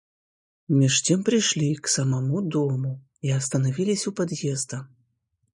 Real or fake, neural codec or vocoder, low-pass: real; none; 10.8 kHz